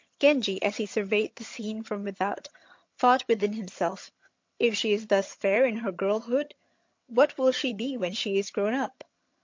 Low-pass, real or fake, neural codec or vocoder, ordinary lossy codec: 7.2 kHz; fake; vocoder, 22.05 kHz, 80 mel bands, HiFi-GAN; MP3, 48 kbps